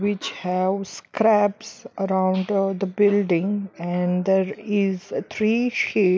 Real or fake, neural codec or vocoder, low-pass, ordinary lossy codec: real; none; none; none